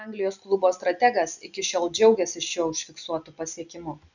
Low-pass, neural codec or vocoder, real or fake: 7.2 kHz; none; real